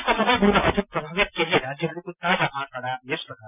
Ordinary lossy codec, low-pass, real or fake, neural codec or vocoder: none; 3.6 kHz; real; none